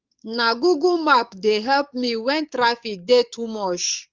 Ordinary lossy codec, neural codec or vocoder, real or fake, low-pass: Opus, 32 kbps; codec, 44.1 kHz, 7.8 kbps, DAC; fake; 7.2 kHz